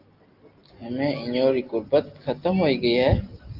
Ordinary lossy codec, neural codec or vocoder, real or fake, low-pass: Opus, 32 kbps; none; real; 5.4 kHz